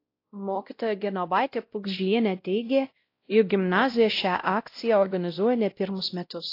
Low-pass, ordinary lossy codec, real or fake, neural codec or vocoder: 5.4 kHz; AAC, 32 kbps; fake; codec, 16 kHz, 0.5 kbps, X-Codec, WavLM features, trained on Multilingual LibriSpeech